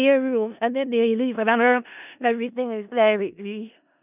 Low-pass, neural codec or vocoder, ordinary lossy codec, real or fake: 3.6 kHz; codec, 16 kHz in and 24 kHz out, 0.4 kbps, LongCat-Audio-Codec, four codebook decoder; none; fake